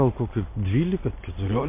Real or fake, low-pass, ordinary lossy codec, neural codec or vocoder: real; 3.6 kHz; MP3, 24 kbps; none